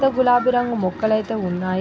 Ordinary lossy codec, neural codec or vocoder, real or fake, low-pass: none; none; real; none